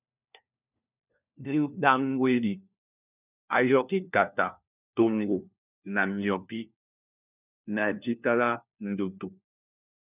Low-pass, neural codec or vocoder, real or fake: 3.6 kHz; codec, 16 kHz, 1 kbps, FunCodec, trained on LibriTTS, 50 frames a second; fake